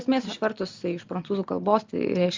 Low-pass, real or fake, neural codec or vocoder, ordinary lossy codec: 7.2 kHz; real; none; Opus, 32 kbps